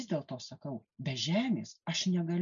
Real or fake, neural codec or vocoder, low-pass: real; none; 7.2 kHz